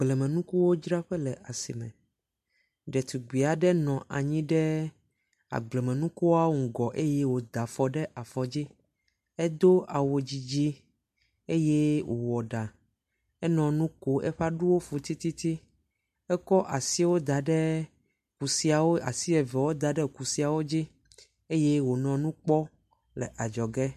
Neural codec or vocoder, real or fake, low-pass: none; real; 14.4 kHz